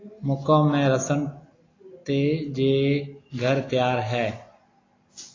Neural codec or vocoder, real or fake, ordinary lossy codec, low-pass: none; real; AAC, 32 kbps; 7.2 kHz